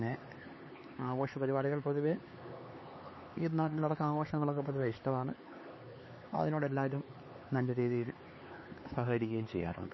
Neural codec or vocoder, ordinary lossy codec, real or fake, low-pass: codec, 16 kHz, 4 kbps, X-Codec, HuBERT features, trained on LibriSpeech; MP3, 24 kbps; fake; 7.2 kHz